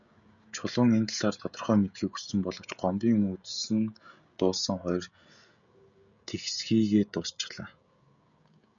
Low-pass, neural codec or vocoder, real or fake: 7.2 kHz; codec, 16 kHz, 16 kbps, FreqCodec, smaller model; fake